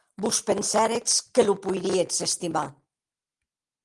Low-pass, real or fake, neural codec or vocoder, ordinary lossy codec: 10.8 kHz; real; none; Opus, 24 kbps